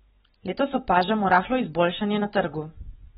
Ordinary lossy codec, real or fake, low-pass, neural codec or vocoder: AAC, 16 kbps; real; 7.2 kHz; none